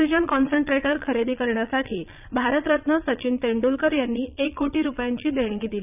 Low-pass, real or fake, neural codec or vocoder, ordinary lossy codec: 3.6 kHz; fake; vocoder, 22.05 kHz, 80 mel bands, Vocos; none